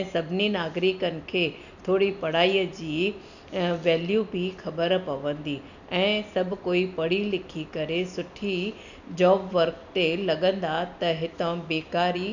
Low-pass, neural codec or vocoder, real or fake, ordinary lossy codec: 7.2 kHz; none; real; none